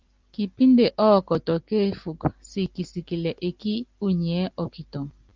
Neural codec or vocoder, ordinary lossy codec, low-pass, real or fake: none; Opus, 32 kbps; 7.2 kHz; real